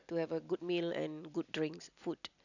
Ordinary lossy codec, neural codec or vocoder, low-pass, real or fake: none; none; 7.2 kHz; real